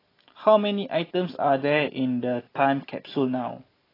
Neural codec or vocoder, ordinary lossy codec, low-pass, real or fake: none; AAC, 24 kbps; 5.4 kHz; real